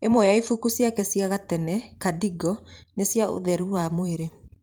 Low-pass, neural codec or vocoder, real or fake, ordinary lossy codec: 19.8 kHz; vocoder, 44.1 kHz, 128 mel bands every 512 samples, BigVGAN v2; fake; Opus, 32 kbps